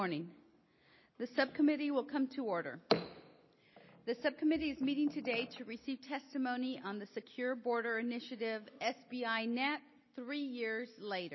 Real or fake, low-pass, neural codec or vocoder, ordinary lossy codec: real; 7.2 kHz; none; MP3, 24 kbps